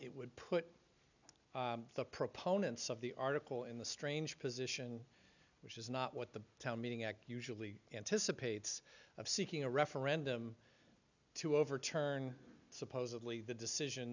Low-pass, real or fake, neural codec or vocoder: 7.2 kHz; real; none